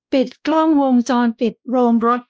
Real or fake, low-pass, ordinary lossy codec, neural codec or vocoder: fake; none; none; codec, 16 kHz, 1 kbps, X-Codec, WavLM features, trained on Multilingual LibriSpeech